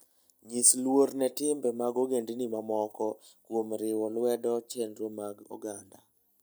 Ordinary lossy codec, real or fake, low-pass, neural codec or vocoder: none; real; none; none